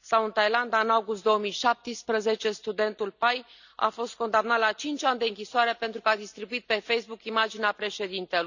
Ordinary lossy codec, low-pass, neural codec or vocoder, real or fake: none; 7.2 kHz; none; real